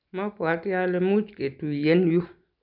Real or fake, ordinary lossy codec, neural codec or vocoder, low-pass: real; none; none; 5.4 kHz